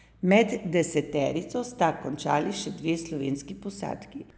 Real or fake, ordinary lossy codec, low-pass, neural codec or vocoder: real; none; none; none